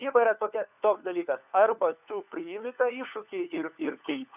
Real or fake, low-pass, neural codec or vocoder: fake; 3.6 kHz; codec, 16 kHz, 4 kbps, FunCodec, trained on LibriTTS, 50 frames a second